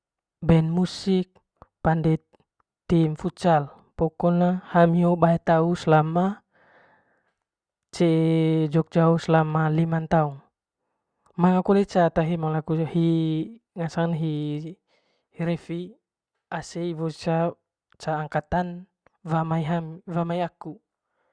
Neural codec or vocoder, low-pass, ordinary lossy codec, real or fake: none; 9.9 kHz; Opus, 64 kbps; real